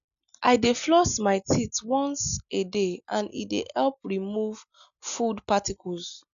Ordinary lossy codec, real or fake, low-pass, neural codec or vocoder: none; real; 7.2 kHz; none